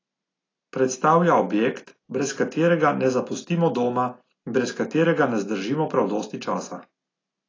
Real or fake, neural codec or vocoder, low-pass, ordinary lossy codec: real; none; 7.2 kHz; AAC, 32 kbps